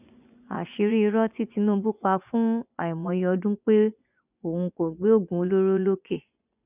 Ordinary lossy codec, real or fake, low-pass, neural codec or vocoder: none; fake; 3.6 kHz; vocoder, 24 kHz, 100 mel bands, Vocos